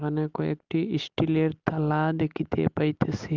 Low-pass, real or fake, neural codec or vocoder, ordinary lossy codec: 7.2 kHz; real; none; Opus, 24 kbps